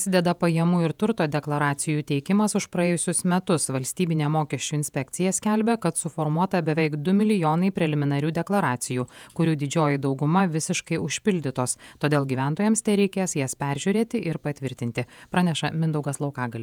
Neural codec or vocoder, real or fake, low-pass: vocoder, 48 kHz, 128 mel bands, Vocos; fake; 19.8 kHz